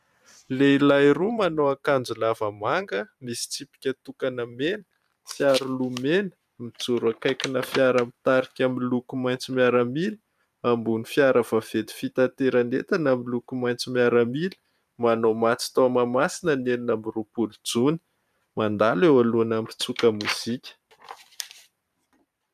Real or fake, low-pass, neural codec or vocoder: fake; 14.4 kHz; vocoder, 44.1 kHz, 128 mel bands every 512 samples, BigVGAN v2